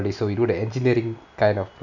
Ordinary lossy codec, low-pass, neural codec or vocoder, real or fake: none; 7.2 kHz; none; real